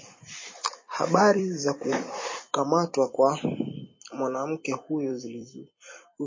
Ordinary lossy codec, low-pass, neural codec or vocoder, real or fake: MP3, 32 kbps; 7.2 kHz; vocoder, 44.1 kHz, 128 mel bands every 256 samples, BigVGAN v2; fake